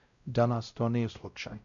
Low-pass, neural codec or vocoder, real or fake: 7.2 kHz; codec, 16 kHz, 0.5 kbps, X-Codec, WavLM features, trained on Multilingual LibriSpeech; fake